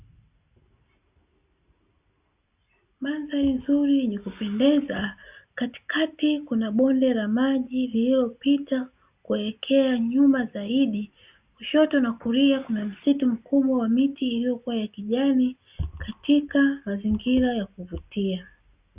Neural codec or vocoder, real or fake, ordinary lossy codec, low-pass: none; real; Opus, 64 kbps; 3.6 kHz